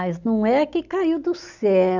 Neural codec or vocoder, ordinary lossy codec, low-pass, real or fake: none; none; 7.2 kHz; real